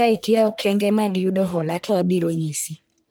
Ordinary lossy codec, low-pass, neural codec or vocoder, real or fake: none; none; codec, 44.1 kHz, 1.7 kbps, Pupu-Codec; fake